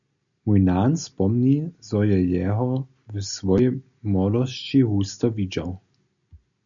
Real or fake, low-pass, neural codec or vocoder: real; 7.2 kHz; none